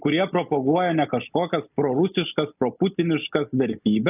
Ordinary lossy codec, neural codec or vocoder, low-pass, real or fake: AAC, 32 kbps; none; 3.6 kHz; real